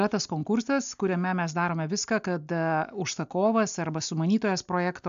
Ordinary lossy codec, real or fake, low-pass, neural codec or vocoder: AAC, 96 kbps; real; 7.2 kHz; none